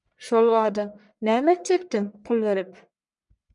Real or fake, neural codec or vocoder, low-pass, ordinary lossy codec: fake; codec, 44.1 kHz, 1.7 kbps, Pupu-Codec; 10.8 kHz; MP3, 96 kbps